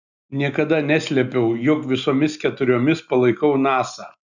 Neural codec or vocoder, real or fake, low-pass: none; real; 7.2 kHz